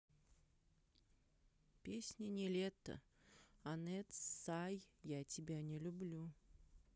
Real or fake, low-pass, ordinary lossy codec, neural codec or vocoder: real; none; none; none